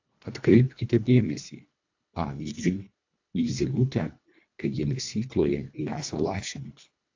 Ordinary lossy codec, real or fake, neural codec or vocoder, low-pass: AAC, 48 kbps; fake; codec, 24 kHz, 1.5 kbps, HILCodec; 7.2 kHz